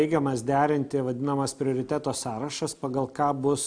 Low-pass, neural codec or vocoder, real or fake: 9.9 kHz; none; real